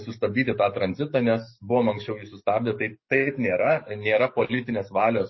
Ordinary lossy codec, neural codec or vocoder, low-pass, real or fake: MP3, 24 kbps; codec, 44.1 kHz, 7.8 kbps, DAC; 7.2 kHz; fake